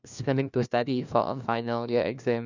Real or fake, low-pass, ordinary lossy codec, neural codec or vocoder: fake; 7.2 kHz; none; codec, 16 kHz, 1 kbps, FunCodec, trained on LibriTTS, 50 frames a second